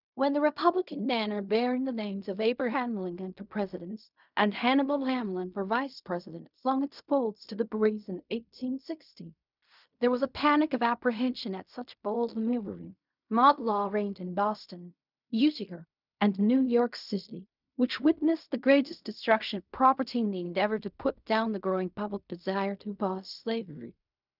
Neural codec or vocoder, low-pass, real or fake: codec, 16 kHz in and 24 kHz out, 0.4 kbps, LongCat-Audio-Codec, fine tuned four codebook decoder; 5.4 kHz; fake